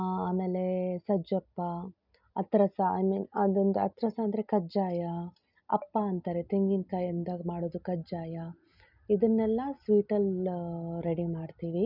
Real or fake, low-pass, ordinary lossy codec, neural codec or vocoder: real; 5.4 kHz; none; none